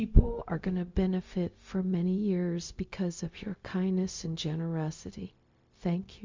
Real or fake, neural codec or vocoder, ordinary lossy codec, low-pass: fake; codec, 16 kHz, 0.4 kbps, LongCat-Audio-Codec; MP3, 64 kbps; 7.2 kHz